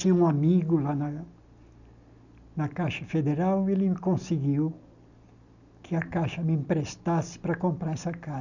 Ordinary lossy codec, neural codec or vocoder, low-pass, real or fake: none; none; 7.2 kHz; real